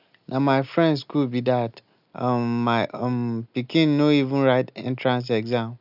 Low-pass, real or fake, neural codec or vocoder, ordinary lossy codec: 5.4 kHz; real; none; none